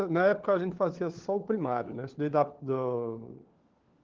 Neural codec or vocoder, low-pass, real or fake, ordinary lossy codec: codec, 16 kHz, 4 kbps, FunCodec, trained on LibriTTS, 50 frames a second; 7.2 kHz; fake; Opus, 16 kbps